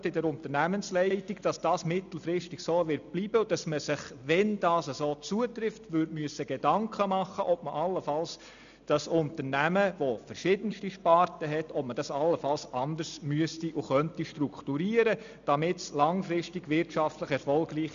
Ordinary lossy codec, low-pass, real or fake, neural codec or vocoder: AAC, 96 kbps; 7.2 kHz; real; none